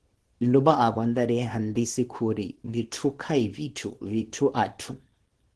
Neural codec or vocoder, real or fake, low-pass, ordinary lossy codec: codec, 24 kHz, 0.9 kbps, WavTokenizer, small release; fake; 10.8 kHz; Opus, 16 kbps